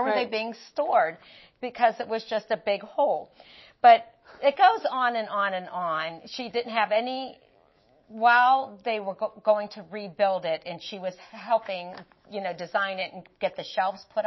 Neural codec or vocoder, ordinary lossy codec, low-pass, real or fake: autoencoder, 48 kHz, 128 numbers a frame, DAC-VAE, trained on Japanese speech; MP3, 24 kbps; 7.2 kHz; fake